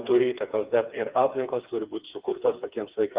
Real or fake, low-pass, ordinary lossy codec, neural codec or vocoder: fake; 3.6 kHz; Opus, 32 kbps; codec, 16 kHz, 4 kbps, FreqCodec, smaller model